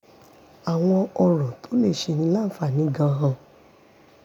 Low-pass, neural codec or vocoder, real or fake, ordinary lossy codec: 19.8 kHz; none; real; none